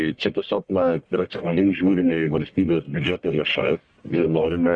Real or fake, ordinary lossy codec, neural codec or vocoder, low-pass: fake; Opus, 64 kbps; codec, 44.1 kHz, 1.7 kbps, Pupu-Codec; 9.9 kHz